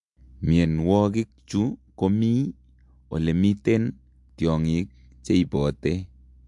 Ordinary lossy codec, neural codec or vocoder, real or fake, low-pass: MP3, 64 kbps; none; real; 10.8 kHz